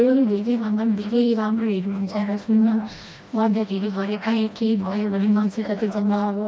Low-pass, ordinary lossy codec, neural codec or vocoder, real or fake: none; none; codec, 16 kHz, 1 kbps, FreqCodec, smaller model; fake